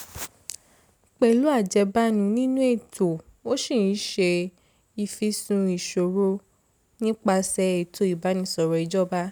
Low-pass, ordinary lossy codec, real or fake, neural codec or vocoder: none; none; real; none